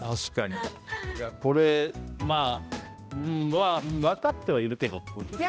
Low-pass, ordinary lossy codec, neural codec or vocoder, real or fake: none; none; codec, 16 kHz, 1 kbps, X-Codec, HuBERT features, trained on balanced general audio; fake